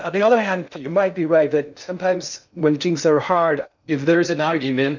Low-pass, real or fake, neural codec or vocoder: 7.2 kHz; fake; codec, 16 kHz in and 24 kHz out, 0.6 kbps, FocalCodec, streaming, 2048 codes